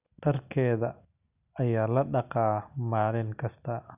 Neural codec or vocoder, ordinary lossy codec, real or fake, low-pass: none; none; real; 3.6 kHz